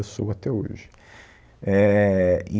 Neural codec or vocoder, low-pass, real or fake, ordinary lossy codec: none; none; real; none